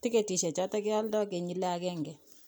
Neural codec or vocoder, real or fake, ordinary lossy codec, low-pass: none; real; none; none